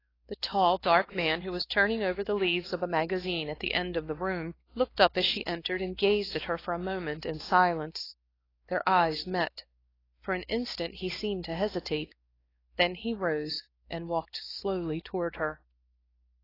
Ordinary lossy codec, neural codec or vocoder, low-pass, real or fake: AAC, 24 kbps; codec, 16 kHz, 2 kbps, X-Codec, WavLM features, trained on Multilingual LibriSpeech; 5.4 kHz; fake